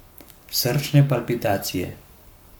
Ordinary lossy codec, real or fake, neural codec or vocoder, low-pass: none; fake; codec, 44.1 kHz, 7.8 kbps, Pupu-Codec; none